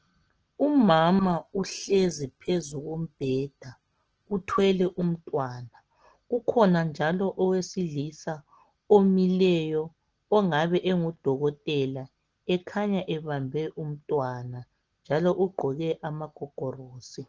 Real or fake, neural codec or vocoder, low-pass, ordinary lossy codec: real; none; 7.2 kHz; Opus, 16 kbps